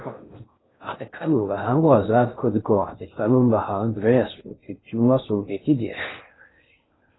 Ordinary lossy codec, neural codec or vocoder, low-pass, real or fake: AAC, 16 kbps; codec, 16 kHz in and 24 kHz out, 0.6 kbps, FocalCodec, streaming, 2048 codes; 7.2 kHz; fake